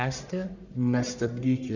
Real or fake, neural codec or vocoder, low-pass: fake; codec, 44.1 kHz, 1.7 kbps, Pupu-Codec; 7.2 kHz